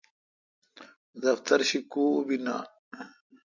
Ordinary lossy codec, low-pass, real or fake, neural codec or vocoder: MP3, 64 kbps; 7.2 kHz; real; none